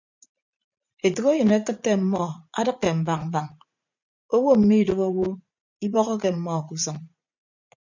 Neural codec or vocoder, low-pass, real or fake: vocoder, 44.1 kHz, 80 mel bands, Vocos; 7.2 kHz; fake